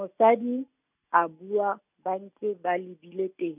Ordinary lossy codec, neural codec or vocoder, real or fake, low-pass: none; none; real; 3.6 kHz